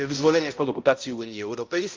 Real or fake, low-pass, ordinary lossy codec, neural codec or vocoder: fake; 7.2 kHz; Opus, 24 kbps; codec, 16 kHz, 0.5 kbps, X-Codec, HuBERT features, trained on balanced general audio